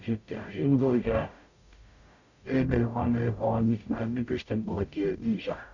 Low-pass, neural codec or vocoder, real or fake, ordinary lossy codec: 7.2 kHz; codec, 44.1 kHz, 0.9 kbps, DAC; fake; none